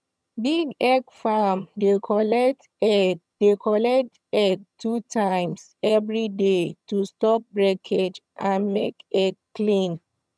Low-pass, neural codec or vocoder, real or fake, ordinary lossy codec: none; vocoder, 22.05 kHz, 80 mel bands, HiFi-GAN; fake; none